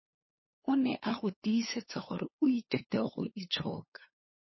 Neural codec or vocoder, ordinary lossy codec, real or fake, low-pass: codec, 16 kHz, 2 kbps, FunCodec, trained on LibriTTS, 25 frames a second; MP3, 24 kbps; fake; 7.2 kHz